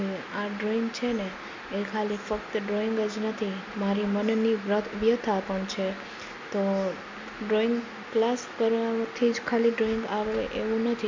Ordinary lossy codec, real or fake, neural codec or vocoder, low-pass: MP3, 64 kbps; real; none; 7.2 kHz